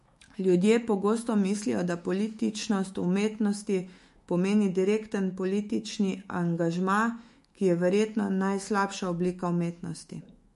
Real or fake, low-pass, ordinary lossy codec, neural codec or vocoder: fake; 14.4 kHz; MP3, 48 kbps; autoencoder, 48 kHz, 128 numbers a frame, DAC-VAE, trained on Japanese speech